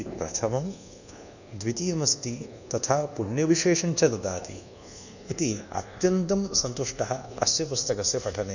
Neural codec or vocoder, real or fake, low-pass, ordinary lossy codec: codec, 24 kHz, 1.2 kbps, DualCodec; fake; 7.2 kHz; none